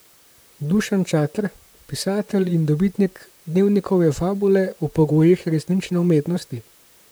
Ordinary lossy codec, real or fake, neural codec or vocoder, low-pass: none; fake; vocoder, 44.1 kHz, 128 mel bands, Pupu-Vocoder; none